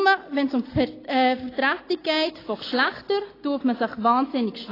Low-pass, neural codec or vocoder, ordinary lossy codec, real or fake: 5.4 kHz; none; AAC, 24 kbps; real